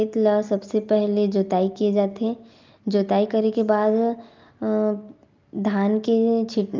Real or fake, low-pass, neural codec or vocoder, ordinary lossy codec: real; 7.2 kHz; none; Opus, 24 kbps